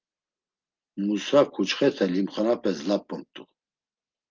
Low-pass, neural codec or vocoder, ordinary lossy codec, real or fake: 7.2 kHz; none; Opus, 24 kbps; real